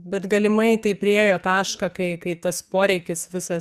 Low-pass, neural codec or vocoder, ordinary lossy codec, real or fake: 14.4 kHz; codec, 44.1 kHz, 2.6 kbps, SNAC; Opus, 64 kbps; fake